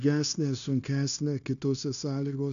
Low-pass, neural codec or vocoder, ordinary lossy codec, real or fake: 7.2 kHz; codec, 16 kHz, 0.9 kbps, LongCat-Audio-Codec; AAC, 96 kbps; fake